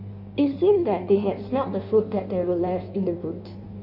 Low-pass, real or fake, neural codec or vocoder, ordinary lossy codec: 5.4 kHz; fake; codec, 16 kHz in and 24 kHz out, 1.1 kbps, FireRedTTS-2 codec; none